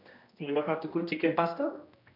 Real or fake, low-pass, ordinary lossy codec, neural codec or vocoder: fake; 5.4 kHz; none; codec, 16 kHz, 1 kbps, X-Codec, HuBERT features, trained on general audio